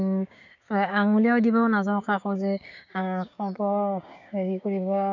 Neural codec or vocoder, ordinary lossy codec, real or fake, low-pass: codec, 16 kHz, 6 kbps, DAC; none; fake; 7.2 kHz